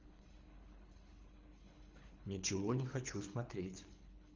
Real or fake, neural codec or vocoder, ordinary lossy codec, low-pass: fake; codec, 24 kHz, 3 kbps, HILCodec; Opus, 32 kbps; 7.2 kHz